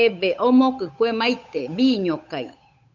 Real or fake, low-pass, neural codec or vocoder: fake; 7.2 kHz; codec, 16 kHz, 8 kbps, FunCodec, trained on Chinese and English, 25 frames a second